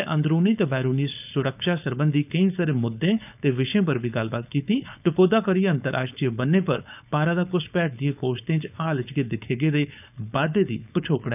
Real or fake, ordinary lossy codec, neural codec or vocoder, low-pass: fake; none; codec, 16 kHz, 4.8 kbps, FACodec; 3.6 kHz